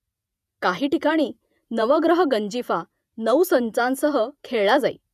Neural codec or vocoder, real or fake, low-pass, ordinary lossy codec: vocoder, 48 kHz, 128 mel bands, Vocos; fake; 14.4 kHz; none